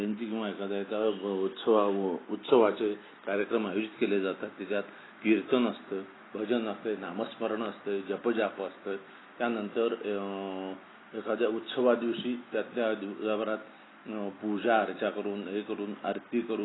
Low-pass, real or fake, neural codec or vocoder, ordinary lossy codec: 7.2 kHz; real; none; AAC, 16 kbps